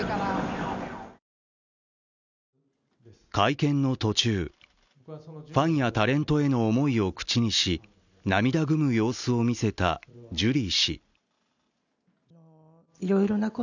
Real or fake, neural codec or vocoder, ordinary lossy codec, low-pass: real; none; none; 7.2 kHz